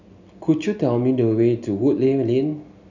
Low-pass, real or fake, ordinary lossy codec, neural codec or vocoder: 7.2 kHz; real; none; none